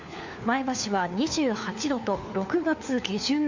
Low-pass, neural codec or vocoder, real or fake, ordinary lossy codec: 7.2 kHz; codec, 16 kHz, 4 kbps, FunCodec, trained on LibriTTS, 50 frames a second; fake; Opus, 64 kbps